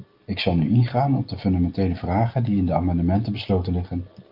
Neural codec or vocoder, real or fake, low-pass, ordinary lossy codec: none; real; 5.4 kHz; Opus, 32 kbps